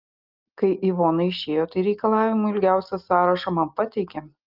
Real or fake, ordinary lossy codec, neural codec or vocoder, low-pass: real; Opus, 32 kbps; none; 5.4 kHz